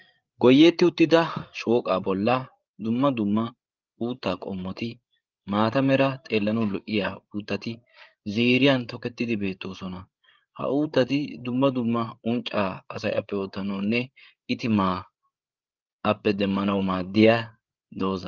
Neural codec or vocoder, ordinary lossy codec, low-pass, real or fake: codec, 16 kHz, 8 kbps, FreqCodec, larger model; Opus, 24 kbps; 7.2 kHz; fake